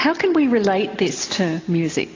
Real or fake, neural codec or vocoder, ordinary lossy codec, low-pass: real; none; AAC, 32 kbps; 7.2 kHz